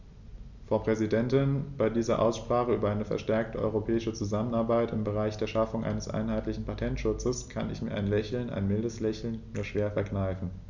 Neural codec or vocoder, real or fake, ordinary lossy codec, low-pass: none; real; none; 7.2 kHz